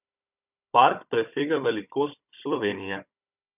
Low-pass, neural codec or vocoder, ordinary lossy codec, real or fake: 3.6 kHz; codec, 16 kHz, 16 kbps, FunCodec, trained on Chinese and English, 50 frames a second; none; fake